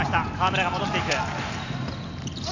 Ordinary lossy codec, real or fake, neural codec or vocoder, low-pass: none; real; none; 7.2 kHz